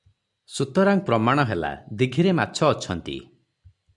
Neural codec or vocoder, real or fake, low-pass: none; real; 10.8 kHz